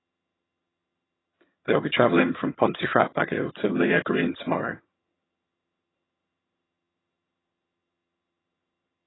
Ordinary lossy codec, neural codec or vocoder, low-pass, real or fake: AAC, 16 kbps; vocoder, 22.05 kHz, 80 mel bands, HiFi-GAN; 7.2 kHz; fake